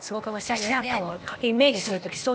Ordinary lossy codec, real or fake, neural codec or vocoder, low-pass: none; fake; codec, 16 kHz, 0.8 kbps, ZipCodec; none